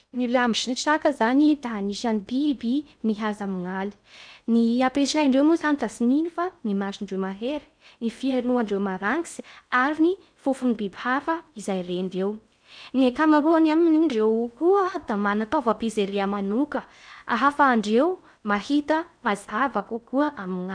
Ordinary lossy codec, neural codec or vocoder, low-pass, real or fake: none; codec, 16 kHz in and 24 kHz out, 0.6 kbps, FocalCodec, streaming, 2048 codes; 9.9 kHz; fake